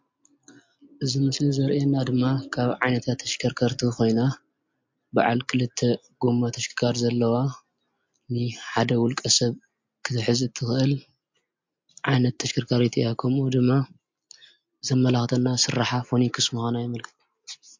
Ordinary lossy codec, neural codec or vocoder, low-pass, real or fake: MP3, 48 kbps; none; 7.2 kHz; real